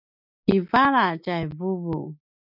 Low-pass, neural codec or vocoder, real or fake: 5.4 kHz; none; real